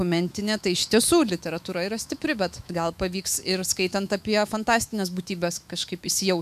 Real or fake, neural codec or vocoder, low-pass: fake; autoencoder, 48 kHz, 128 numbers a frame, DAC-VAE, trained on Japanese speech; 14.4 kHz